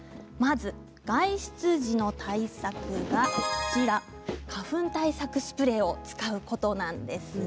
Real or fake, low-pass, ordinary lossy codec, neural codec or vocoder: real; none; none; none